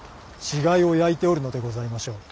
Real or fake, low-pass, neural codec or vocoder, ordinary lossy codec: real; none; none; none